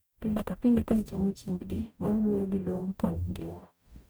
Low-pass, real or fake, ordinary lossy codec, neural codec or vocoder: none; fake; none; codec, 44.1 kHz, 0.9 kbps, DAC